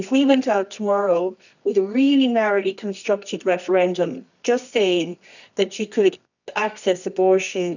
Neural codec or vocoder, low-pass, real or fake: codec, 24 kHz, 0.9 kbps, WavTokenizer, medium music audio release; 7.2 kHz; fake